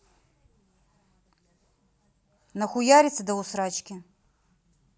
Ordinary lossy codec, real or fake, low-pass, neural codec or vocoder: none; real; none; none